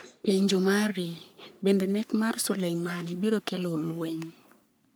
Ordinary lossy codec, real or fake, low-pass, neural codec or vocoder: none; fake; none; codec, 44.1 kHz, 3.4 kbps, Pupu-Codec